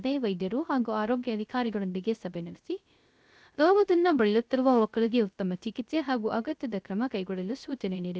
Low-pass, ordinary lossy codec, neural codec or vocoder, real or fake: none; none; codec, 16 kHz, 0.3 kbps, FocalCodec; fake